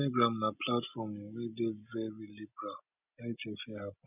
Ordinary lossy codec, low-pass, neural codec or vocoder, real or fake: none; 3.6 kHz; none; real